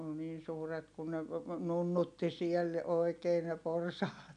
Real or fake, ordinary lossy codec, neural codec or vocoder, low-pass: real; none; none; 9.9 kHz